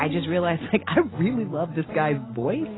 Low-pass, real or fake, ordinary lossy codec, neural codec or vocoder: 7.2 kHz; real; AAC, 16 kbps; none